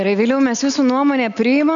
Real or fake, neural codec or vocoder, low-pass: real; none; 7.2 kHz